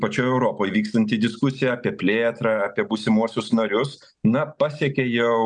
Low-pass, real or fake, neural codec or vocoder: 9.9 kHz; real; none